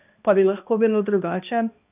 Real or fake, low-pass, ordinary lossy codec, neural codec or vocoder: fake; 3.6 kHz; none; codec, 16 kHz, 1 kbps, X-Codec, HuBERT features, trained on balanced general audio